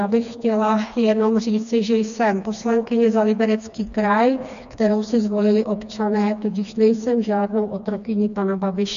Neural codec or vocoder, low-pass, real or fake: codec, 16 kHz, 2 kbps, FreqCodec, smaller model; 7.2 kHz; fake